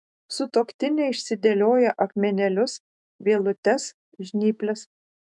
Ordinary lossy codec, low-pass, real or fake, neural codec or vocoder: MP3, 96 kbps; 10.8 kHz; fake; autoencoder, 48 kHz, 128 numbers a frame, DAC-VAE, trained on Japanese speech